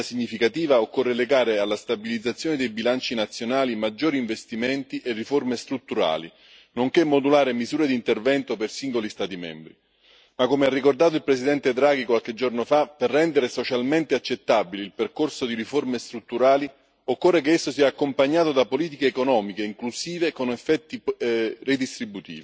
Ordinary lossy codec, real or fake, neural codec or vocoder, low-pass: none; real; none; none